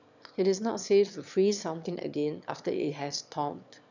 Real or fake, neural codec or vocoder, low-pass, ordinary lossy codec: fake; autoencoder, 22.05 kHz, a latent of 192 numbers a frame, VITS, trained on one speaker; 7.2 kHz; none